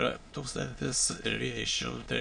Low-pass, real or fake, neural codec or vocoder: 9.9 kHz; fake; autoencoder, 22.05 kHz, a latent of 192 numbers a frame, VITS, trained on many speakers